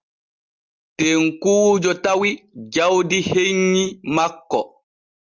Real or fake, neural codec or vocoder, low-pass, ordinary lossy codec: real; none; 7.2 kHz; Opus, 24 kbps